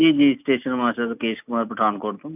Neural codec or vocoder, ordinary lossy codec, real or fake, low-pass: none; none; real; 3.6 kHz